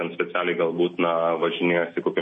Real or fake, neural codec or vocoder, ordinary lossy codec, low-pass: real; none; MP3, 32 kbps; 10.8 kHz